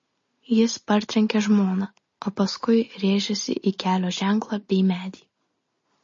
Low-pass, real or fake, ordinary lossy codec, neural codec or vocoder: 7.2 kHz; real; MP3, 32 kbps; none